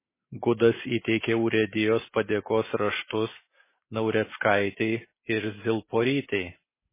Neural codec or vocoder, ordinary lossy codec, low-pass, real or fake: none; MP3, 16 kbps; 3.6 kHz; real